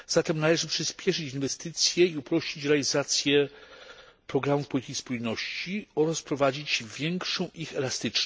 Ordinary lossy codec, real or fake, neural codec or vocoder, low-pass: none; real; none; none